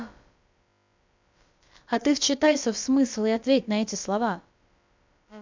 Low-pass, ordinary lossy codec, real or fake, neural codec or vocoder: 7.2 kHz; MP3, 64 kbps; fake; codec, 16 kHz, about 1 kbps, DyCAST, with the encoder's durations